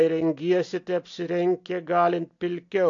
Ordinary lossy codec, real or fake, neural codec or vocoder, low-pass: AAC, 48 kbps; real; none; 7.2 kHz